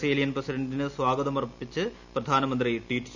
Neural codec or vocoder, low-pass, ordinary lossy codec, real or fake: none; 7.2 kHz; none; real